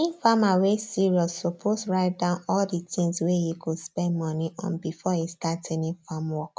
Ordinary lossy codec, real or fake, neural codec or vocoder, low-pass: none; real; none; none